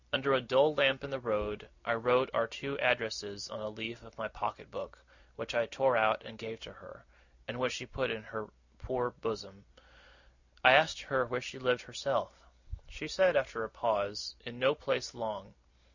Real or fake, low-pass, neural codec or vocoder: real; 7.2 kHz; none